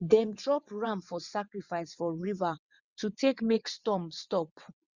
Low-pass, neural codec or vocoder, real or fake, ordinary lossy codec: 7.2 kHz; vocoder, 22.05 kHz, 80 mel bands, WaveNeXt; fake; Opus, 64 kbps